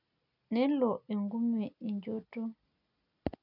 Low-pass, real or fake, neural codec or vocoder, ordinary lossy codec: 5.4 kHz; real; none; none